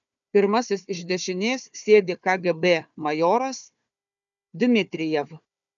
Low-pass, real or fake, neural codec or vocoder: 7.2 kHz; fake; codec, 16 kHz, 4 kbps, FunCodec, trained on Chinese and English, 50 frames a second